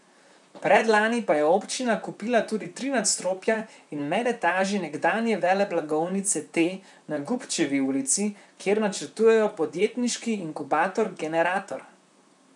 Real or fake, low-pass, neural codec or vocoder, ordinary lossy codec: fake; 10.8 kHz; vocoder, 44.1 kHz, 128 mel bands, Pupu-Vocoder; none